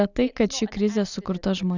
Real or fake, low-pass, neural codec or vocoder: real; 7.2 kHz; none